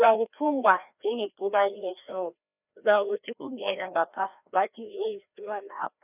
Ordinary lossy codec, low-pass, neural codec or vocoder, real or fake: none; 3.6 kHz; codec, 16 kHz, 1 kbps, FreqCodec, larger model; fake